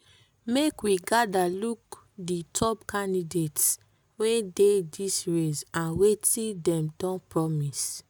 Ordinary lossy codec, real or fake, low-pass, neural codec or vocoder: none; real; none; none